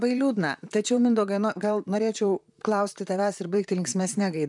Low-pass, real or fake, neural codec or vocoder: 10.8 kHz; fake; vocoder, 44.1 kHz, 128 mel bands, Pupu-Vocoder